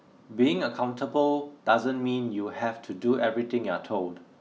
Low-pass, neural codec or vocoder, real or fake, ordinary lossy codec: none; none; real; none